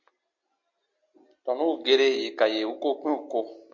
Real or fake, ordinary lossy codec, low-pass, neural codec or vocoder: real; MP3, 64 kbps; 7.2 kHz; none